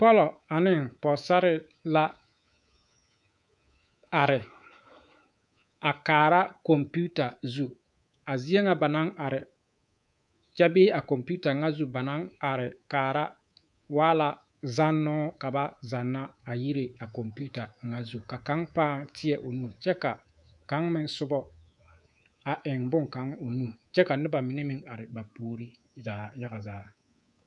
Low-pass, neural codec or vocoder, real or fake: 10.8 kHz; codec, 24 kHz, 3.1 kbps, DualCodec; fake